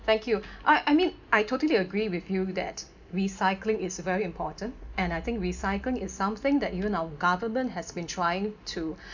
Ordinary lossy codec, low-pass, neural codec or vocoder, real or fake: none; 7.2 kHz; none; real